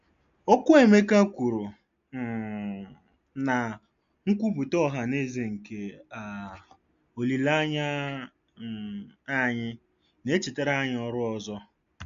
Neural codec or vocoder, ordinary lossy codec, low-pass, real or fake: none; MP3, 64 kbps; 7.2 kHz; real